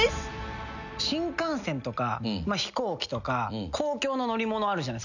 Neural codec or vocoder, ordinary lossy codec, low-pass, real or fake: none; none; 7.2 kHz; real